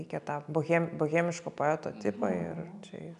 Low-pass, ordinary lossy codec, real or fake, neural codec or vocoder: 10.8 kHz; AAC, 96 kbps; real; none